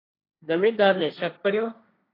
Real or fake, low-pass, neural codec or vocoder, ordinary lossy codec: fake; 5.4 kHz; codec, 16 kHz, 1.1 kbps, Voila-Tokenizer; AAC, 24 kbps